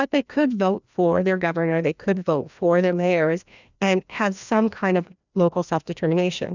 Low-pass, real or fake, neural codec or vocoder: 7.2 kHz; fake; codec, 16 kHz, 1 kbps, FreqCodec, larger model